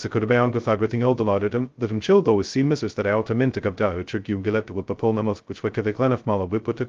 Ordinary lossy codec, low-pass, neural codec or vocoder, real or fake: Opus, 32 kbps; 7.2 kHz; codec, 16 kHz, 0.2 kbps, FocalCodec; fake